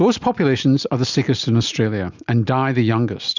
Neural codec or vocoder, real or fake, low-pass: none; real; 7.2 kHz